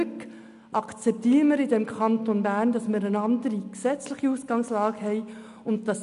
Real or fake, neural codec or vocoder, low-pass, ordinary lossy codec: real; none; 10.8 kHz; none